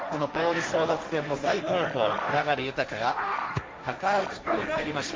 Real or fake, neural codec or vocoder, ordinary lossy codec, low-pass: fake; codec, 16 kHz, 1.1 kbps, Voila-Tokenizer; none; none